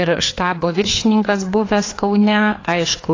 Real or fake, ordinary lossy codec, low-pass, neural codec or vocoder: fake; AAC, 32 kbps; 7.2 kHz; codec, 16 kHz, 2 kbps, FreqCodec, larger model